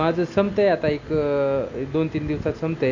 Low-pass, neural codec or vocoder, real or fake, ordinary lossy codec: 7.2 kHz; none; real; AAC, 48 kbps